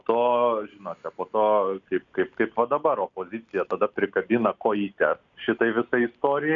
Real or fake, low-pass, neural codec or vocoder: real; 7.2 kHz; none